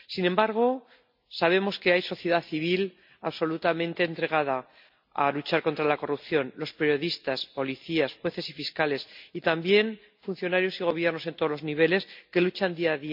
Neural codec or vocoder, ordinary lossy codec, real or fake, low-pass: none; MP3, 48 kbps; real; 5.4 kHz